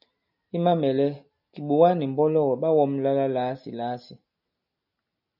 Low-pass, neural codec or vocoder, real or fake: 5.4 kHz; none; real